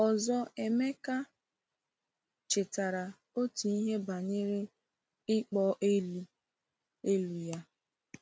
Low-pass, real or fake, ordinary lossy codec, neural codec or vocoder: none; real; none; none